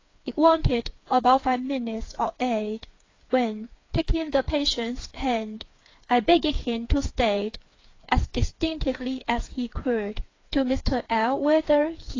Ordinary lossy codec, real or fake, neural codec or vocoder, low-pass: AAC, 32 kbps; fake; codec, 16 kHz, 4 kbps, FreqCodec, smaller model; 7.2 kHz